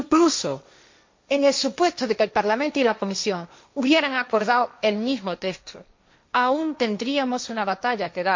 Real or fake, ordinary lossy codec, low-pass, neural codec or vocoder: fake; MP3, 48 kbps; 7.2 kHz; codec, 16 kHz, 1.1 kbps, Voila-Tokenizer